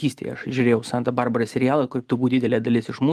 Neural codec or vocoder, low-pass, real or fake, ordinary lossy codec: none; 14.4 kHz; real; Opus, 32 kbps